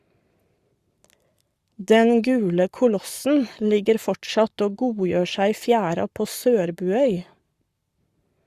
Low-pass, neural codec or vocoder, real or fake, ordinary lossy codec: 14.4 kHz; codec, 44.1 kHz, 7.8 kbps, Pupu-Codec; fake; Opus, 64 kbps